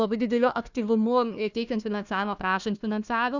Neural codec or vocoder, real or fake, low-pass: codec, 16 kHz, 1 kbps, FunCodec, trained on Chinese and English, 50 frames a second; fake; 7.2 kHz